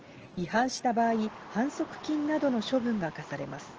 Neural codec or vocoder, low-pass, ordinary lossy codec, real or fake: none; 7.2 kHz; Opus, 16 kbps; real